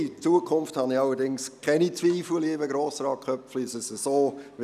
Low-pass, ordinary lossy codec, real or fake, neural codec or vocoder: 14.4 kHz; none; real; none